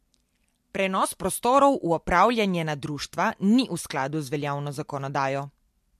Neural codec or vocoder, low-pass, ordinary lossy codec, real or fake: none; 14.4 kHz; MP3, 64 kbps; real